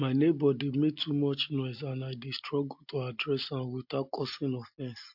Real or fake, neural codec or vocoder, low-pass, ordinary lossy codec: real; none; 5.4 kHz; MP3, 48 kbps